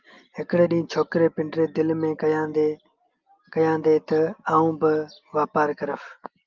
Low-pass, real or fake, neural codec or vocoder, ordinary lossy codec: 7.2 kHz; real; none; Opus, 32 kbps